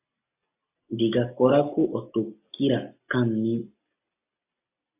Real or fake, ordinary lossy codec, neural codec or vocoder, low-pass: real; AAC, 32 kbps; none; 3.6 kHz